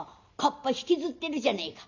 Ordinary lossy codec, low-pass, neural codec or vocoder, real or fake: none; 7.2 kHz; none; real